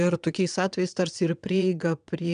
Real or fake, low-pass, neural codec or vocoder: fake; 9.9 kHz; vocoder, 22.05 kHz, 80 mel bands, WaveNeXt